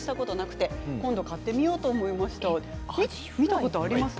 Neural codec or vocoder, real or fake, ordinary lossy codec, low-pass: none; real; none; none